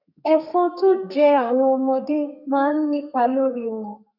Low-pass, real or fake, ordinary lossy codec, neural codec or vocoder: 5.4 kHz; fake; none; codec, 32 kHz, 1.9 kbps, SNAC